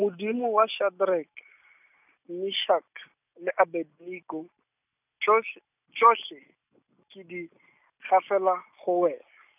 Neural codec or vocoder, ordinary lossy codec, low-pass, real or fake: none; none; 3.6 kHz; real